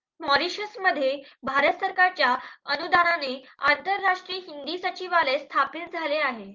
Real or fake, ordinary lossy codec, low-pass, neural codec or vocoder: real; Opus, 24 kbps; 7.2 kHz; none